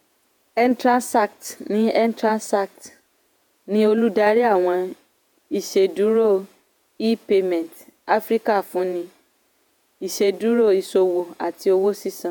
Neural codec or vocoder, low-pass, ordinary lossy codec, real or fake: vocoder, 48 kHz, 128 mel bands, Vocos; none; none; fake